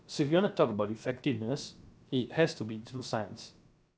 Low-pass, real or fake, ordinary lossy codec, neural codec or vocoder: none; fake; none; codec, 16 kHz, about 1 kbps, DyCAST, with the encoder's durations